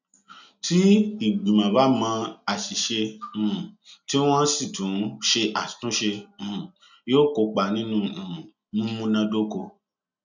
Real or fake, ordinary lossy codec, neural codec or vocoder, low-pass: real; none; none; 7.2 kHz